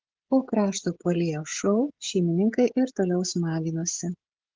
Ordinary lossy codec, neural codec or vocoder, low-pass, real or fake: Opus, 16 kbps; codec, 16 kHz, 16 kbps, FreqCodec, smaller model; 7.2 kHz; fake